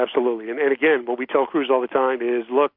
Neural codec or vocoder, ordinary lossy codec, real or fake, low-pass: none; MP3, 48 kbps; real; 5.4 kHz